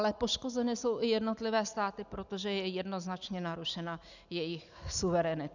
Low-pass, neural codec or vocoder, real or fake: 7.2 kHz; none; real